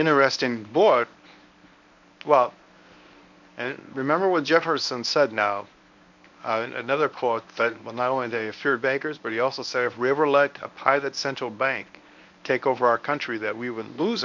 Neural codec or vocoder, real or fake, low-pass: codec, 24 kHz, 0.9 kbps, WavTokenizer, medium speech release version 1; fake; 7.2 kHz